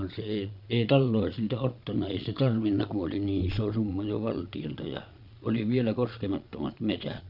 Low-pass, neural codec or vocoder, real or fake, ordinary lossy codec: 5.4 kHz; vocoder, 22.05 kHz, 80 mel bands, Vocos; fake; none